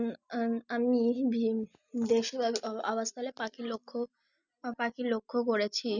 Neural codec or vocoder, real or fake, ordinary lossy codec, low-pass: none; real; none; 7.2 kHz